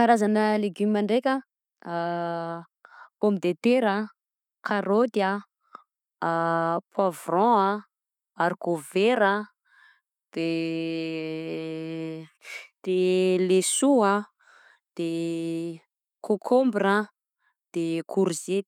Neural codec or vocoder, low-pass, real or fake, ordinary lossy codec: none; 19.8 kHz; real; none